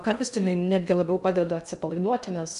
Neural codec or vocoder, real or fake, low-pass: codec, 16 kHz in and 24 kHz out, 0.6 kbps, FocalCodec, streaming, 2048 codes; fake; 10.8 kHz